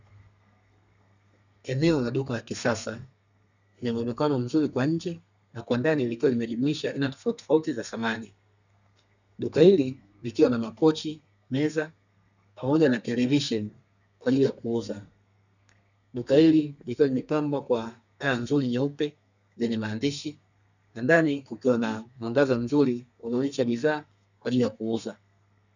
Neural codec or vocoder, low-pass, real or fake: codec, 32 kHz, 1.9 kbps, SNAC; 7.2 kHz; fake